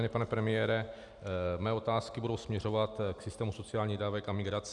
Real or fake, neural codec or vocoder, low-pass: real; none; 10.8 kHz